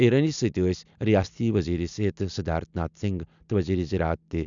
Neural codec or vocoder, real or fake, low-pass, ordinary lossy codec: none; real; 7.2 kHz; MP3, 96 kbps